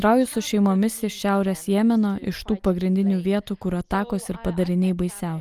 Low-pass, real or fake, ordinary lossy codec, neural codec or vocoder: 14.4 kHz; real; Opus, 32 kbps; none